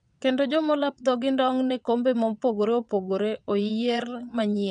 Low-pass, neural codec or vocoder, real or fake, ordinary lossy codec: 9.9 kHz; vocoder, 22.05 kHz, 80 mel bands, WaveNeXt; fake; none